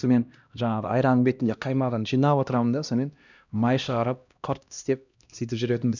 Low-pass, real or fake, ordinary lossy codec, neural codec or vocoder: 7.2 kHz; fake; none; codec, 16 kHz, 1 kbps, X-Codec, HuBERT features, trained on LibriSpeech